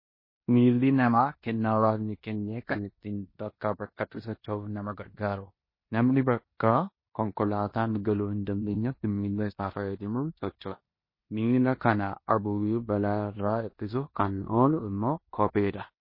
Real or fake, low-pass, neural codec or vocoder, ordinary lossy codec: fake; 5.4 kHz; codec, 16 kHz in and 24 kHz out, 0.9 kbps, LongCat-Audio-Codec, four codebook decoder; MP3, 24 kbps